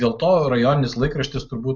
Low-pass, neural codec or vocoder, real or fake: 7.2 kHz; none; real